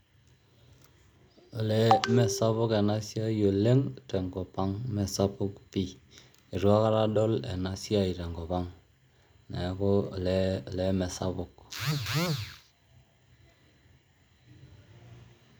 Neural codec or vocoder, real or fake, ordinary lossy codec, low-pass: none; real; none; none